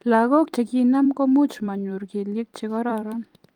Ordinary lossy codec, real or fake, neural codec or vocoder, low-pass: Opus, 32 kbps; fake; vocoder, 44.1 kHz, 128 mel bands every 256 samples, BigVGAN v2; 19.8 kHz